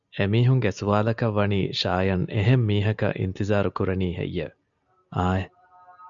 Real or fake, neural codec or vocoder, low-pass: real; none; 7.2 kHz